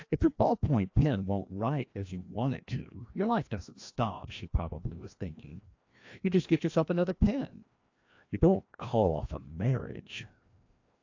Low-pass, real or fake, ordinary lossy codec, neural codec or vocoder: 7.2 kHz; fake; AAC, 48 kbps; codec, 16 kHz, 1 kbps, FreqCodec, larger model